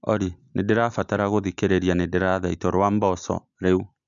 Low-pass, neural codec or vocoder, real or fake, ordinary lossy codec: 7.2 kHz; none; real; none